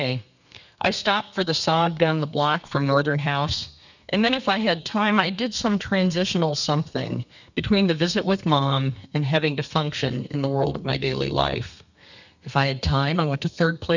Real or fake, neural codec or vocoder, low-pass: fake; codec, 32 kHz, 1.9 kbps, SNAC; 7.2 kHz